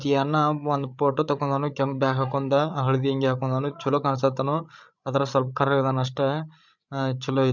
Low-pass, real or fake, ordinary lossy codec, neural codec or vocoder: 7.2 kHz; fake; none; codec, 16 kHz, 8 kbps, FreqCodec, larger model